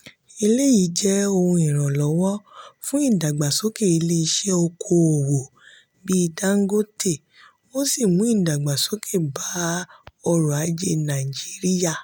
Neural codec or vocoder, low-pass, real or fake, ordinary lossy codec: none; none; real; none